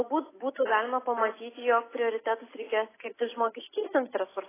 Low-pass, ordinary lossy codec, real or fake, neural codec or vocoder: 3.6 kHz; AAC, 16 kbps; real; none